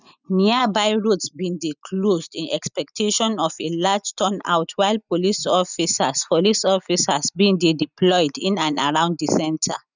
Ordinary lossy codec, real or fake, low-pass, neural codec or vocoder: none; real; 7.2 kHz; none